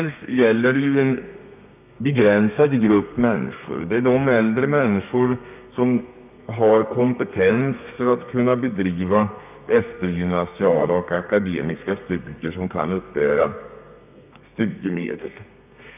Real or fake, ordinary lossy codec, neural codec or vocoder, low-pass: fake; none; codec, 32 kHz, 1.9 kbps, SNAC; 3.6 kHz